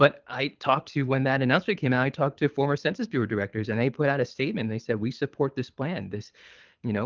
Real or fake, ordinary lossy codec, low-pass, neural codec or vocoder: fake; Opus, 32 kbps; 7.2 kHz; codec, 24 kHz, 6 kbps, HILCodec